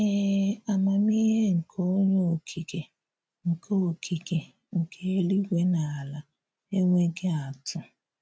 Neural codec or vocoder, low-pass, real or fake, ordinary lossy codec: none; none; real; none